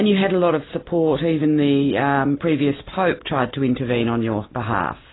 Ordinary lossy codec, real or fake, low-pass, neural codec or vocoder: AAC, 16 kbps; real; 7.2 kHz; none